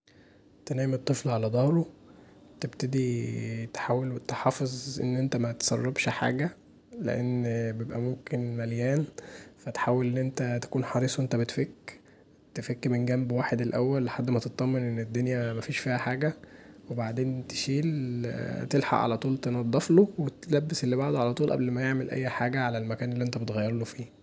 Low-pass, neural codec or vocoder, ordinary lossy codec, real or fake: none; none; none; real